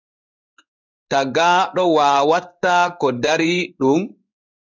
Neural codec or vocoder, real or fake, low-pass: codec, 16 kHz in and 24 kHz out, 1 kbps, XY-Tokenizer; fake; 7.2 kHz